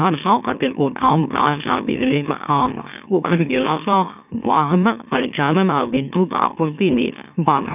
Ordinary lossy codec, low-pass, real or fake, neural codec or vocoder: none; 3.6 kHz; fake; autoencoder, 44.1 kHz, a latent of 192 numbers a frame, MeloTTS